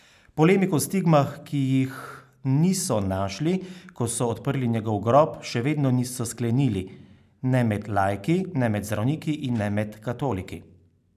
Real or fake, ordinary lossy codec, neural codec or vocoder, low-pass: real; none; none; 14.4 kHz